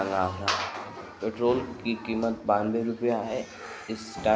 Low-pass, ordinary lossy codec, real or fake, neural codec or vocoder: none; none; real; none